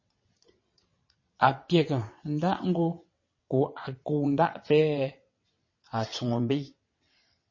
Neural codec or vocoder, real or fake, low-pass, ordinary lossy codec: vocoder, 22.05 kHz, 80 mel bands, WaveNeXt; fake; 7.2 kHz; MP3, 32 kbps